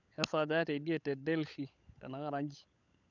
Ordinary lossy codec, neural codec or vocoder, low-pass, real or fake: none; codec, 16 kHz, 16 kbps, FunCodec, trained on LibriTTS, 50 frames a second; 7.2 kHz; fake